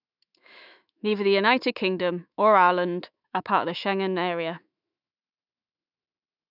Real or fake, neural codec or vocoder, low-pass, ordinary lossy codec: fake; autoencoder, 48 kHz, 128 numbers a frame, DAC-VAE, trained on Japanese speech; 5.4 kHz; none